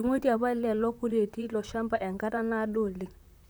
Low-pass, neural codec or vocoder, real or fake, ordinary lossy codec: none; vocoder, 44.1 kHz, 128 mel bands, Pupu-Vocoder; fake; none